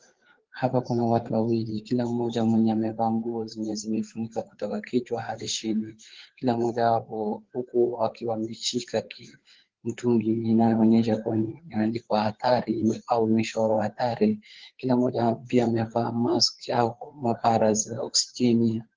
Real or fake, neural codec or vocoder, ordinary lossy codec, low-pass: fake; codec, 16 kHz, 4 kbps, FreqCodec, larger model; Opus, 16 kbps; 7.2 kHz